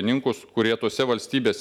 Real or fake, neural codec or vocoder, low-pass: real; none; 19.8 kHz